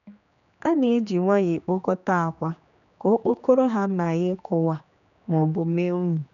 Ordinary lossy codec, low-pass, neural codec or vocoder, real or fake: none; 7.2 kHz; codec, 16 kHz, 2 kbps, X-Codec, HuBERT features, trained on general audio; fake